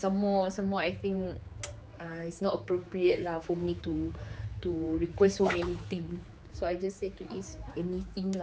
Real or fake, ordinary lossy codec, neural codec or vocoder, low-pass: fake; none; codec, 16 kHz, 4 kbps, X-Codec, HuBERT features, trained on general audio; none